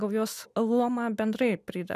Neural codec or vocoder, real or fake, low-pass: none; real; 14.4 kHz